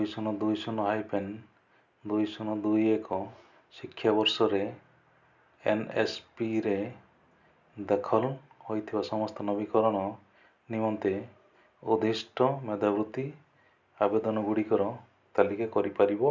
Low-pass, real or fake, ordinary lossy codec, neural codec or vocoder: 7.2 kHz; real; none; none